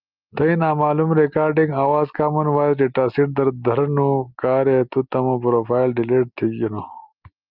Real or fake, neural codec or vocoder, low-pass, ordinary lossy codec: real; none; 5.4 kHz; Opus, 24 kbps